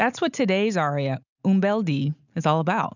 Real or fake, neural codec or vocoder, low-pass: real; none; 7.2 kHz